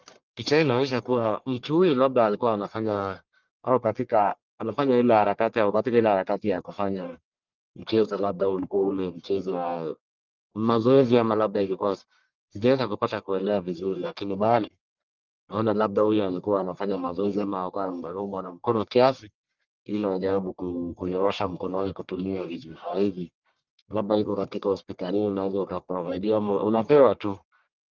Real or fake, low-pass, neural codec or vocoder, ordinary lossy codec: fake; 7.2 kHz; codec, 44.1 kHz, 1.7 kbps, Pupu-Codec; Opus, 32 kbps